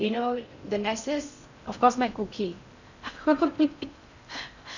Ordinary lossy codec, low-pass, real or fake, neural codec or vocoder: none; 7.2 kHz; fake; codec, 16 kHz in and 24 kHz out, 0.6 kbps, FocalCodec, streaming, 4096 codes